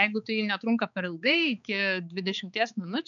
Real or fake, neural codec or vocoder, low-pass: fake; codec, 16 kHz, 4 kbps, X-Codec, HuBERT features, trained on balanced general audio; 7.2 kHz